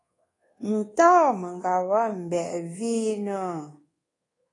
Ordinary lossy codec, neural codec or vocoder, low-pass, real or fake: AAC, 32 kbps; codec, 24 kHz, 1.2 kbps, DualCodec; 10.8 kHz; fake